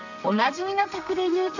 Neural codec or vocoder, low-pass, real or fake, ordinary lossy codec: codec, 32 kHz, 1.9 kbps, SNAC; 7.2 kHz; fake; none